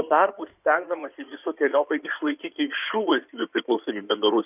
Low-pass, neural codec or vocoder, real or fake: 3.6 kHz; codec, 16 kHz in and 24 kHz out, 2.2 kbps, FireRedTTS-2 codec; fake